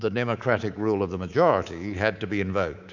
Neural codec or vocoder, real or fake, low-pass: codec, 24 kHz, 3.1 kbps, DualCodec; fake; 7.2 kHz